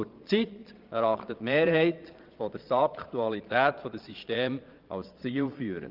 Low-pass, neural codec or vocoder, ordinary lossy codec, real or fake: 5.4 kHz; vocoder, 22.05 kHz, 80 mel bands, Vocos; Opus, 32 kbps; fake